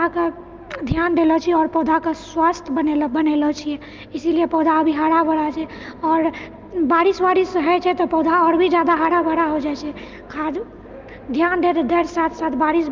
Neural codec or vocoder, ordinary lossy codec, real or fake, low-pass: none; Opus, 24 kbps; real; 7.2 kHz